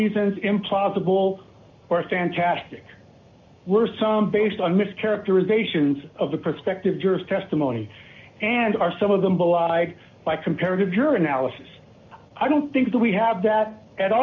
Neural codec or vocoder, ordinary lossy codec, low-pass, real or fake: none; AAC, 48 kbps; 7.2 kHz; real